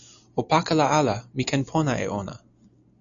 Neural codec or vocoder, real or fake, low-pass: none; real; 7.2 kHz